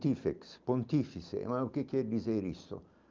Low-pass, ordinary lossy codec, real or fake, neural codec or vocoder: 7.2 kHz; Opus, 24 kbps; real; none